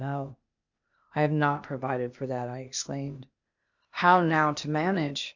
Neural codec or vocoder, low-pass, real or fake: codec, 16 kHz, 0.8 kbps, ZipCodec; 7.2 kHz; fake